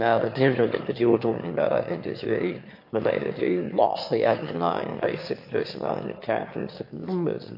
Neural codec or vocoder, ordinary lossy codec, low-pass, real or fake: autoencoder, 22.05 kHz, a latent of 192 numbers a frame, VITS, trained on one speaker; MP3, 32 kbps; 5.4 kHz; fake